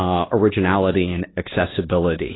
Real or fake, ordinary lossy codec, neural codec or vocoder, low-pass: fake; AAC, 16 kbps; codec, 24 kHz, 1.2 kbps, DualCodec; 7.2 kHz